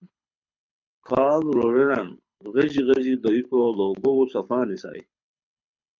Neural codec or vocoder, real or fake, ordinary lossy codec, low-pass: codec, 24 kHz, 6 kbps, HILCodec; fake; MP3, 64 kbps; 7.2 kHz